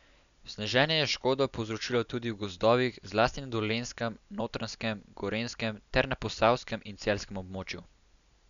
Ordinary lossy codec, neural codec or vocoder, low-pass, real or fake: MP3, 96 kbps; none; 7.2 kHz; real